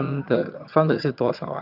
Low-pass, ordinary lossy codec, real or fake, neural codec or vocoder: 5.4 kHz; none; fake; vocoder, 22.05 kHz, 80 mel bands, HiFi-GAN